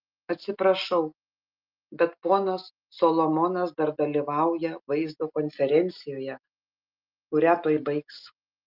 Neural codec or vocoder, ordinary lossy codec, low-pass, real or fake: none; Opus, 32 kbps; 5.4 kHz; real